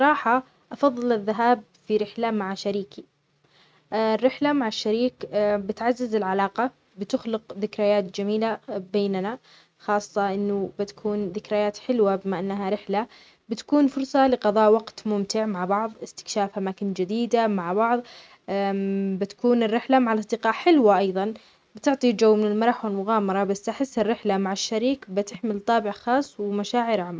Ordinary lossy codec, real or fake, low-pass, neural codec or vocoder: none; real; none; none